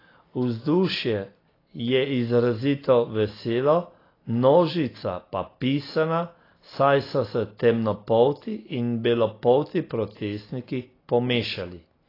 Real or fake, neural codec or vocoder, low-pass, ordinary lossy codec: real; none; 5.4 kHz; AAC, 24 kbps